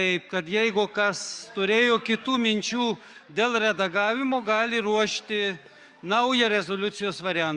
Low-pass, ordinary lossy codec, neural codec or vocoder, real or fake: 10.8 kHz; Opus, 64 kbps; codec, 44.1 kHz, 7.8 kbps, DAC; fake